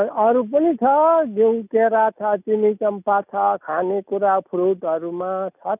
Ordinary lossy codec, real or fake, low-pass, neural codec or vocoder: none; real; 3.6 kHz; none